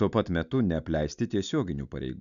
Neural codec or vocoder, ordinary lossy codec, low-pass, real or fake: none; MP3, 96 kbps; 7.2 kHz; real